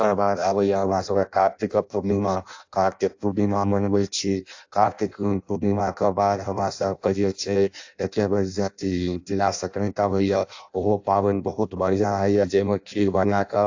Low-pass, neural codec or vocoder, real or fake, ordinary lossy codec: 7.2 kHz; codec, 16 kHz in and 24 kHz out, 0.6 kbps, FireRedTTS-2 codec; fake; AAC, 48 kbps